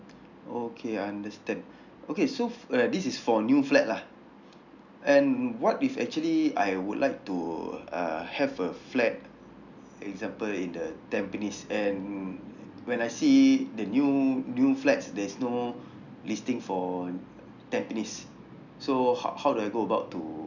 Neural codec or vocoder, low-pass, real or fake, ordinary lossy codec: none; 7.2 kHz; real; none